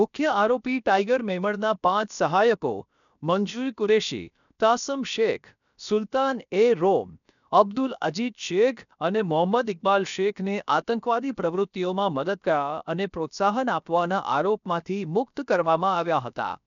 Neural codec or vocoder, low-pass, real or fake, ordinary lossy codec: codec, 16 kHz, about 1 kbps, DyCAST, with the encoder's durations; 7.2 kHz; fake; none